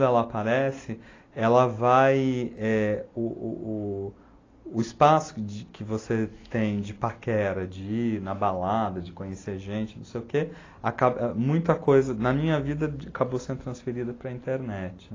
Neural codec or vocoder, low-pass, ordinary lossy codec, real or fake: none; 7.2 kHz; AAC, 32 kbps; real